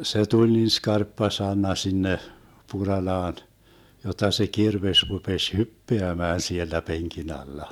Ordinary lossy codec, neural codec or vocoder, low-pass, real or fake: none; none; 19.8 kHz; real